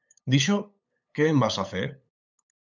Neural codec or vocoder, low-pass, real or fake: codec, 16 kHz, 8 kbps, FunCodec, trained on LibriTTS, 25 frames a second; 7.2 kHz; fake